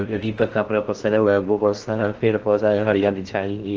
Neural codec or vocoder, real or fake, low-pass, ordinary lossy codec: codec, 16 kHz in and 24 kHz out, 0.6 kbps, FocalCodec, streaming, 4096 codes; fake; 7.2 kHz; Opus, 16 kbps